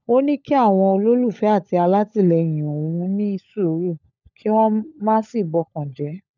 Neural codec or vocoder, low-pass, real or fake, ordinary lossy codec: codec, 16 kHz, 16 kbps, FunCodec, trained on LibriTTS, 50 frames a second; 7.2 kHz; fake; none